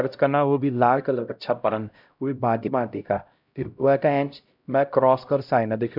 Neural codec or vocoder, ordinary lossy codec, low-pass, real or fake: codec, 16 kHz, 0.5 kbps, X-Codec, HuBERT features, trained on LibriSpeech; none; 5.4 kHz; fake